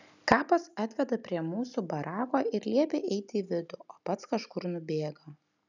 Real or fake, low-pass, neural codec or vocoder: real; 7.2 kHz; none